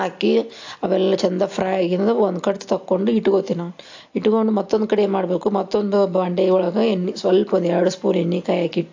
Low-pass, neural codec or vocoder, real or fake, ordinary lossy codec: 7.2 kHz; none; real; AAC, 48 kbps